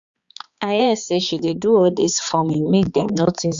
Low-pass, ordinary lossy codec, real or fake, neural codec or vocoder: 7.2 kHz; Opus, 64 kbps; fake; codec, 16 kHz, 4 kbps, X-Codec, HuBERT features, trained on balanced general audio